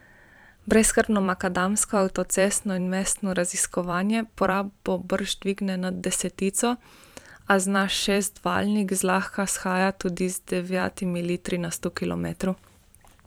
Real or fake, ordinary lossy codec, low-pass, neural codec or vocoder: fake; none; none; vocoder, 44.1 kHz, 128 mel bands every 512 samples, BigVGAN v2